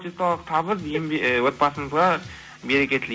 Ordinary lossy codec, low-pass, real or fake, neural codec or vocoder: none; none; real; none